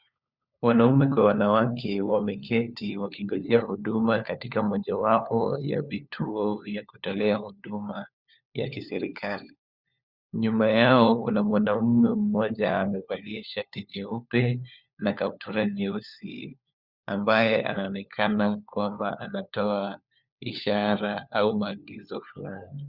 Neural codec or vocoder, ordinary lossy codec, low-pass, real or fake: codec, 16 kHz, 4 kbps, FunCodec, trained on LibriTTS, 50 frames a second; Opus, 64 kbps; 5.4 kHz; fake